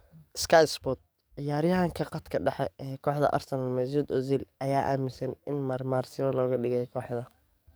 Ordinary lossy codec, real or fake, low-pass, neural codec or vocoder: none; fake; none; codec, 44.1 kHz, 7.8 kbps, DAC